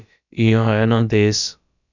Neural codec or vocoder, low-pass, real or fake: codec, 16 kHz, about 1 kbps, DyCAST, with the encoder's durations; 7.2 kHz; fake